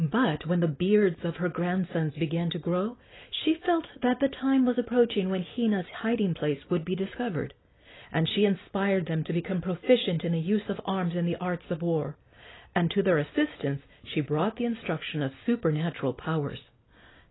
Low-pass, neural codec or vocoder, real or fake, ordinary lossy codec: 7.2 kHz; none; real; AAC, 16 kbps